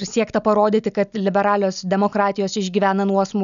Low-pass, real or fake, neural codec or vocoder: 7.2 kHz; real; none